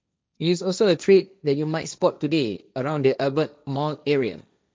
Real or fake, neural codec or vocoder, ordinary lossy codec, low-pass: fake; codec, 16 kHz, 1.1 kbps, Voila-Tokenizer; none; none